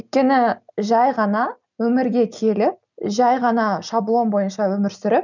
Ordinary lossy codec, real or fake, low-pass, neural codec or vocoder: none; real; 7.2 kHz; none